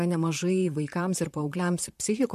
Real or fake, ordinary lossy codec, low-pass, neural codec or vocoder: fake; MP3, 64 kbps; 14.4 kHz; vocoder, 44.1 kHz, 128 mel bands, Pupu-Vocoder